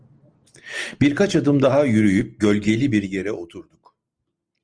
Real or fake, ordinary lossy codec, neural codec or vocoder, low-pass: real; Opus, 24 kbps; none; 9.9 kHz